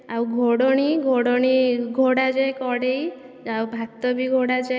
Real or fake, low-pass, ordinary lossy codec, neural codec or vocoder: real; none; none; none